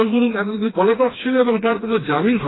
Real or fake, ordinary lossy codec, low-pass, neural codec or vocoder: fake; AAC, 16 kbps; 7.2 kHz; codec, 16 kHz, 2 kbps, FreqCodec, smaller model